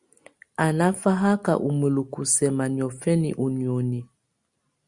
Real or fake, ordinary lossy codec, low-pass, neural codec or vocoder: real; Opus, 64 kbps; 10.8 kHz; none